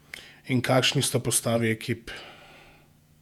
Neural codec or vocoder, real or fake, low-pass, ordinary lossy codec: vocoder, 48 kHz, 128 mel bands, Vocos; fake; 19.8 kHz; none